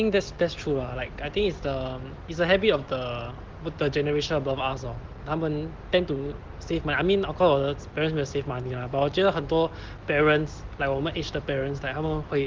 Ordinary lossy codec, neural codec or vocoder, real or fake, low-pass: Opus, 16 kbps; none; real; 7.2 kHz